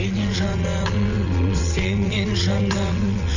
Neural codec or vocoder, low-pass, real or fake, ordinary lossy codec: vocoder, 22.05 kHz, 80 mel bands, WaveNeXt; 7.2 kHz; fake; none